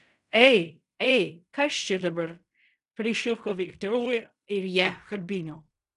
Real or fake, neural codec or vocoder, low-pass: fake; codec, 16 kHz in and 24 kHz out, 0.4 kbps, LongCat-Audio-Codec, fine tuned four codebook decoder; 10.8 kHz